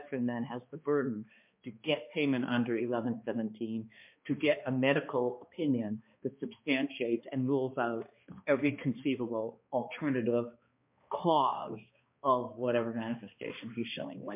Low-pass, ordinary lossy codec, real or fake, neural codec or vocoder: 3.6 kHz; MP3, 32 kbps; fake; codec, 16 kHz, 2 kbps, X-Codec, HuBERT features, trained on balanced general audio